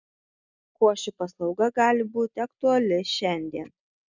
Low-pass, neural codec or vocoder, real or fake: 7.2 kHz; none; real